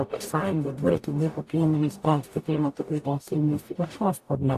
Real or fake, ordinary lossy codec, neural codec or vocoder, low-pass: fake; AAC, 64 kbps; codec, 44.1 kHz, 0.9 kbps, DAC; 14.4 kHz